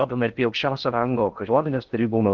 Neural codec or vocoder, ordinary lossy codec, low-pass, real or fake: codec, 16 kHz in and 24 kHz out, 0.6 kbps, FocalCodec, streaming, 2048 codes; Opus, 16 kbps; 7.2 kHz; fake